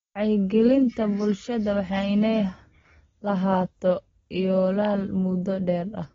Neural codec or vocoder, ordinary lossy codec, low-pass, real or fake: none; AAC, 24 kbps; 7.2 kHz; real